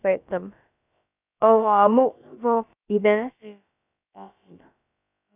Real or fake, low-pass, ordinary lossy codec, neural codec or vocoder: fake; 3.6 kHz; none; codec, 16 kHz, about 1 kbps, DyCAST, with the encoder's durations